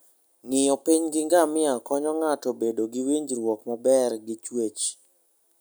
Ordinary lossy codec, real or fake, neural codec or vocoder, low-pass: none; real; none; none